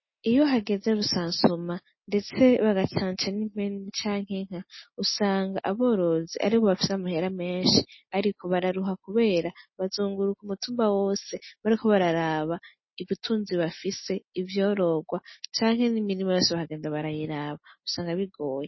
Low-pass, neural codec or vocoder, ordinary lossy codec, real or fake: 7.2 kHz; none; MP3, 24 kbps; real